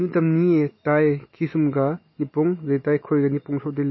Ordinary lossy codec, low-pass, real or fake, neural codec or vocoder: MP3, 24 kbps; 7.2 kHz; real; none